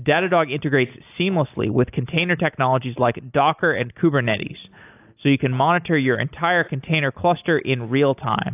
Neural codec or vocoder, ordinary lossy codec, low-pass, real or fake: none; AAC, 32 kbps; 3.6 kHz; real